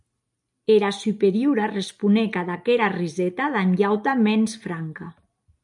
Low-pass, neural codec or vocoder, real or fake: 10.8 kHz; none; real